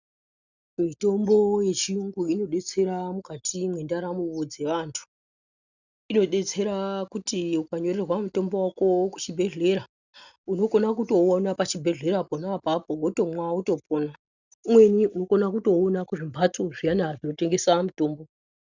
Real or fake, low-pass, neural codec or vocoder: real; 7.2 kHz; none